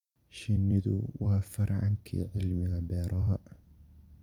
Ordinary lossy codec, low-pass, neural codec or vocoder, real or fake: none; 19.8 kHz; vocoder, 44.1 kHz, 128 mel bands every 256 samples, BigVGAN v2; fake